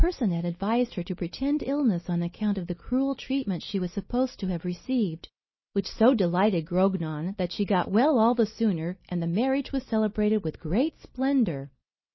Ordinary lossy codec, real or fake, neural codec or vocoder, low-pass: MP3, 24 kbps; real; none; 7.2 kHz